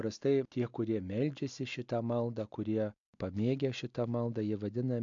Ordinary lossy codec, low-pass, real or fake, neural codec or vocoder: AAC, 64 kbps; 7.2 kHz; real; none